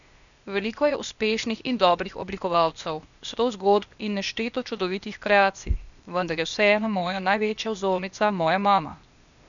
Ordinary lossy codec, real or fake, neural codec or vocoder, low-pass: none; fake; codec, 16 kHz, 0.8 kbps, ZipCodec; 7.2 kHz